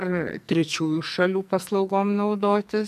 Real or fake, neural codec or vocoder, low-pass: fake; codec, 44.1 kHz, 2.6 kbps, SNAC; 14.4 kHz